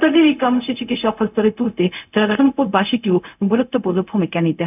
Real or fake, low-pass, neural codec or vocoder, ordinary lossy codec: fake; 3.6 kHz; codec, 16 kHz, 0.4 kbps, LongCat-Audio-Codec; none